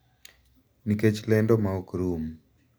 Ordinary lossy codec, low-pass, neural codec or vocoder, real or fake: none; none; none; real